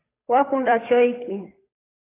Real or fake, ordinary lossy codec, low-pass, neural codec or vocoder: fake; AAC, 16 kbps; 3.6 kHz; codec, 16 kHz, 8 kbps, FunCodec, trained on Chinese and English, 25 frames a second